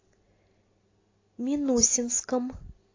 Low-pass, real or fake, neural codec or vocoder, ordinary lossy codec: 7.2 kHz; real; none; AAC, 32 kbps